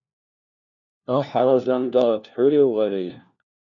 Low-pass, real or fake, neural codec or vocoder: 7.2 kHz; fake; codec, 16 kHz, 1 kbps, FunCodec, trained on LibriTTS, 50 frames a second